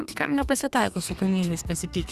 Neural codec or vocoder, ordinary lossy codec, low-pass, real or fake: codec, 32 kHz, 1.9 kbps, SNAC; Opus, 64 kbps; 14.4 kHz; fake